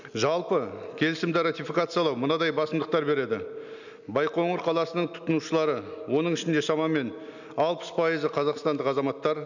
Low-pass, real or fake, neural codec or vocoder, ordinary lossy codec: 7.2 kHz; real; none; none